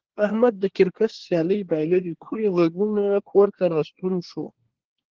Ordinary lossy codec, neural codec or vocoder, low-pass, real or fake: Opus, 16 kbps; codec, 24 kHz, 1 kbps, SNAC; 7.2 kHz; fake